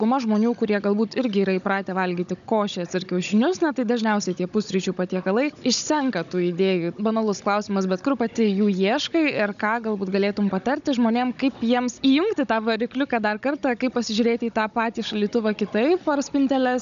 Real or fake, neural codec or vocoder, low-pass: fake; codec, 16 kHz, 16 kbps, FunCodec, trained on Chinese and English, 50 frames a second; 7.2 kHz